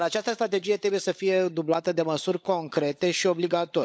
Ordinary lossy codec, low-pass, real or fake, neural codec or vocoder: none; none; fake; codec, 16 kHz, 16 kbps, FunCodec, trained on LibriTTS, 50 frames a second